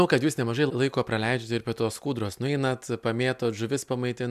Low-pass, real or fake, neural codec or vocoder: 14.4 kHz; real; none